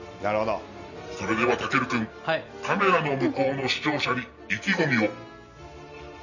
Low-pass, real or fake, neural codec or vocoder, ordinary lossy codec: 7.2 kHz; real; none; none